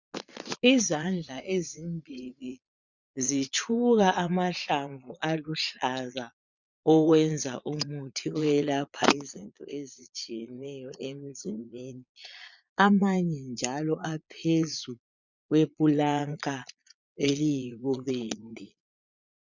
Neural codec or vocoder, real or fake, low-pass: vocoder, 22.05 kHz, 80 mel bands, Vocos; fake; 7.2 kHz